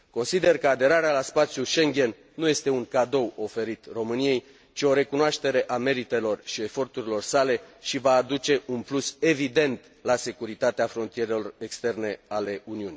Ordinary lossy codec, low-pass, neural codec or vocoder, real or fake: none; none; none; real